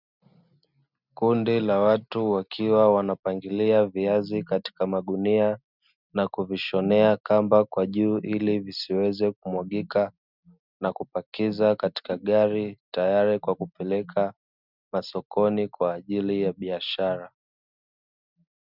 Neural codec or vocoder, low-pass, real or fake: none; 5.4 kHz; real